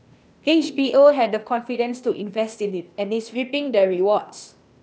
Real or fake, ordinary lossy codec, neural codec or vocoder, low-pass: fake; none; codec, 16 kHz, 0.8 kbps, ZipCodec; none